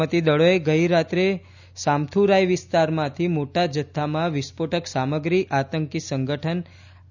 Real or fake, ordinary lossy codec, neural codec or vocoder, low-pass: real; none; none; 7.2 kHz